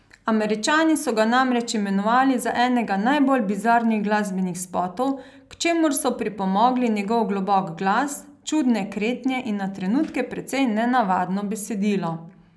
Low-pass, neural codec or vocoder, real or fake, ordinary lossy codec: none; none; real; none